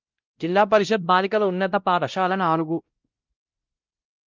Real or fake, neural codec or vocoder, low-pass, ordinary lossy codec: fake; codec, 16 kHz, 0.5 kbps, X-Codec, WavLM features, trained on Multilingual LibriSpeech; 7.2 kHz; Opus, 24 kbps